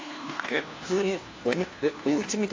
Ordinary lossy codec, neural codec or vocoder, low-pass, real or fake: AAC, 32 kbps; codec, 16 kHz, 1 kbps, FunCodec, trained on LibriTTS, 50 frames a second; 7.2 kHz; fake